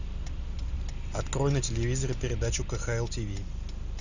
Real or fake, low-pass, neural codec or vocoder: real; 7.2 kHz; none